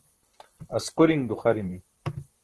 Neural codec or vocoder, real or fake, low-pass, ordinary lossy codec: vocoder, 44.1 kHz, 128 mel bands, Pupu-Vocoder; fake; 10.8 kHz; Opus, 16 kbps